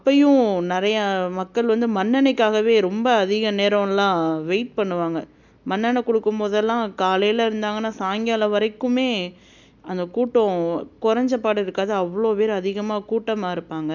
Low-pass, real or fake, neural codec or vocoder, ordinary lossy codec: 7.2 kHz; real; none; none